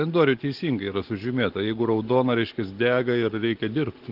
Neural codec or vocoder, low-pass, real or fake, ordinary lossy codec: none; 5.4 kHz; real; Opus, 16 kbps